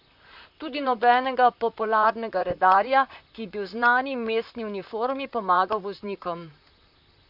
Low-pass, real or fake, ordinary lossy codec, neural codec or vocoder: 5.4 kHz; fake; MP3, 48 kbps; vocoder, 44.1 kHz, 128 mel bands, Pupu-Vocoder